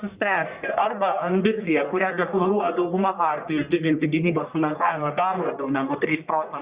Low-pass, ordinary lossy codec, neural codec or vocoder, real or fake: 3.6 kHz; Opus, 64 kbps; codec, 44.1 kHz, 1.7 kbps, Pupu-Codec; fake